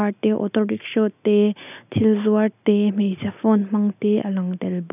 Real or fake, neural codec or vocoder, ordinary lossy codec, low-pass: real; none; none; 3.6 kHz